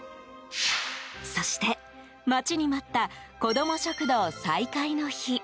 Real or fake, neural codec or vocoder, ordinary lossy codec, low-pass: real; none; none; none